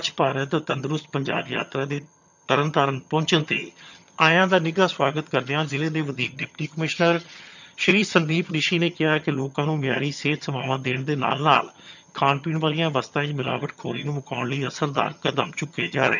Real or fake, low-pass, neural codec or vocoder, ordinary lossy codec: fake; 7.2 kHz; vocoder, 22.05 kHz, 80 mel bands, HiFi-GAN; none